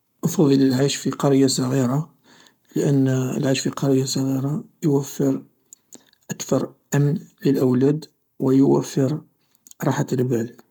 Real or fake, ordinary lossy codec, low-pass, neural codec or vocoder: fake; none; 19.8 kHz; codec, 44.1 kHz, 7.8 kbps, Pupu-Codec